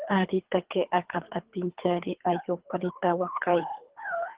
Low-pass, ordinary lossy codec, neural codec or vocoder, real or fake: 3.6 kHz; Opus, 16 kbps; codec, 24 kHz, 3 kbps, HILCodec; fake